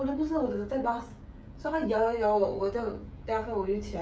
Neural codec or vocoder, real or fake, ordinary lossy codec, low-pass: codec, 16 kHz, 16 kbps, FreqCodec, smaller model; fake; none; none